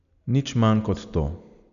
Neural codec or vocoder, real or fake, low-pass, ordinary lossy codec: none; real; 7.2 kHz; none